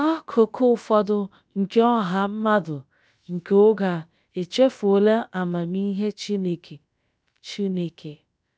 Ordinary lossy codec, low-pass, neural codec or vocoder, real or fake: none; none; codec, 16 kHz, about 1 kbps, DyCAST, with the encoder's durations; fake